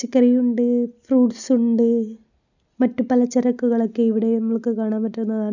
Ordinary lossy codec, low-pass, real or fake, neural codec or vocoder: none; 7.2 kHz; real; none